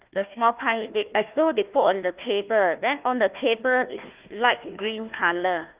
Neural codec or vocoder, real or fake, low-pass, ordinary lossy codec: codec, 16 kHz, 1 kbps, FunCodec, trained on Chinese and English, 50 frames a second; fake; 3.6 kHz; Opus, 32 kbps